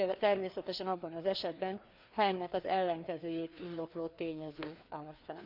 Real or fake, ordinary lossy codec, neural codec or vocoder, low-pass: fake; none; codec, 16 kHz, 2 kbps, FreqCodec, larger model; 5.4 kHz